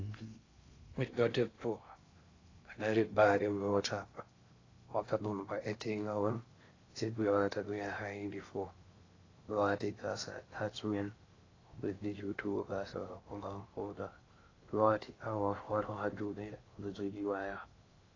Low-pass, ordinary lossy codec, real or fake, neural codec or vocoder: 7.2 kHz; AAC, 32 kbps; fake; codec, 16 kHz in and 24 kHz out, 0.6 kbps, FocalCodec, streaming, 2048 codes